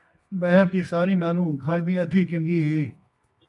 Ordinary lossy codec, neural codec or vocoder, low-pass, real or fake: MP3, 64 kbps; codec, 24 kHz, 0.9 kbps, WavTokenizer, medium music audio release; 10.8 kHz; fake